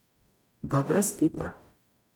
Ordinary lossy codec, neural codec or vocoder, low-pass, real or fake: none; codec, 44.1 kHz, 0.9 kbps, DAC; 19.8 kHz; fake